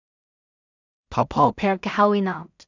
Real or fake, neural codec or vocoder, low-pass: fake; codec, 16 kHz in and 24 kHz out, 0.4 kbps, LongCat-Audio-Codec, two codebook decoder; 7.2 kHz